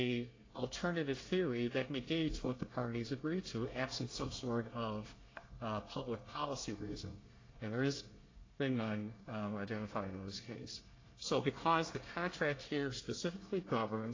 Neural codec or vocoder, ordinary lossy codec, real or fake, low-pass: codec, 24 kHz, 1 kbps, SNAC; AAC, 32 kbps; fake; 7.2 kHz